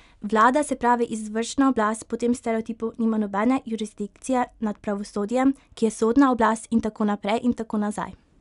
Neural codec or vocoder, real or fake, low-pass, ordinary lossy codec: none; real; 10.8 kHz; none